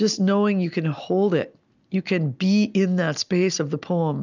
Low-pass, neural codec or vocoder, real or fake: 7.2 kHz; none; real